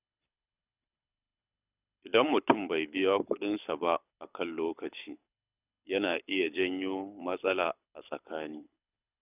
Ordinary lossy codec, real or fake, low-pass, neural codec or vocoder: none; fake; 3.6 kHz; codec, 24 kHz, 6 kbps, HILCodec